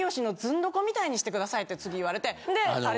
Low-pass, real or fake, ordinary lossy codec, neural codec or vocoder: none; real; none; none